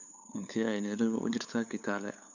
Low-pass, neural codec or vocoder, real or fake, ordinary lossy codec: 7.2 kHz; codec, 16 kHz, 8 kbps, FunCodec, trained on LibriTTS, 25 frames a second; fake; none